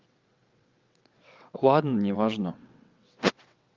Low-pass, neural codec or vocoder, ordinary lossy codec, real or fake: 7.2 kHz; vocoder, 22.05 kHz, 80 mel bands, WaveNeXt; Opus, 32 kbps; fake